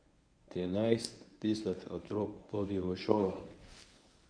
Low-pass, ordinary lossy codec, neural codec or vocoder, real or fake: 9.9 kHz; none; codec, 24 kHz, 0.9 kbps, WavTokenizer, medium speech release version 1; fake